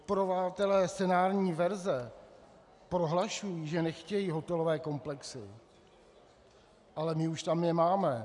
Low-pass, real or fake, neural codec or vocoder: 10.8 kHz; real; none